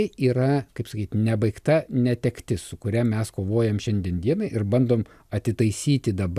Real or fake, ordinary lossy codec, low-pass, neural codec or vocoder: real; AAC, 96 kbps; 14.4 kHz; none